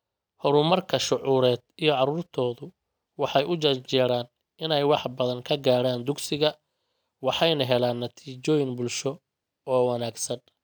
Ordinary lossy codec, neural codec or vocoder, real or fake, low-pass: none; none; real; none